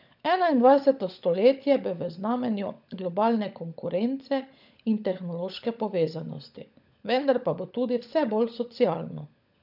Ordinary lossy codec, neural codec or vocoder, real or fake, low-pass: none; codec, 16 kHz, 16 kbps, FunCodec, trained on LibriTTS, 50 frames a second; fake; 5.4 kHz